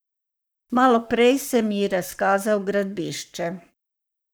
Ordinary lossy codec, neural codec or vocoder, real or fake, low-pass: none; codec, 44.1 kHz, 7.8 kbps, Pupu-Codec; fake; none